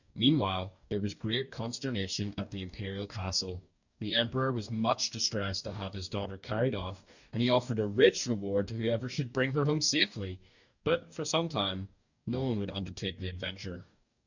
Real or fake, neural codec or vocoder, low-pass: fake; codec, 44.1 kHz, 2.6 kbps, DAC; 7.2 kHz